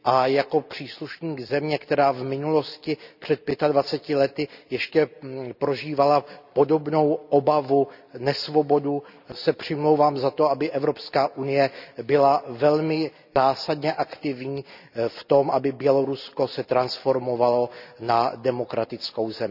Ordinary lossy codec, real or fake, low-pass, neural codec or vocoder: none; real; 5.4 kHz; none